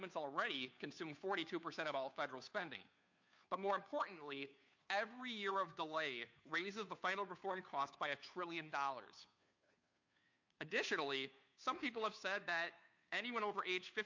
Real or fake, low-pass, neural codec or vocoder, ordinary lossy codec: fake; 7.2 kHz; codec, 16 kHz, 2 kbps, FunCodec, trained on Chinese and English, 25 frames a second; MP3, 48 kbps